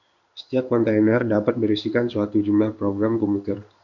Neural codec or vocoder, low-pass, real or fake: codec, 16 kHz in and 24 kHz out, 1 kbps, XY-Tokenizer; 7.2 kHz; fake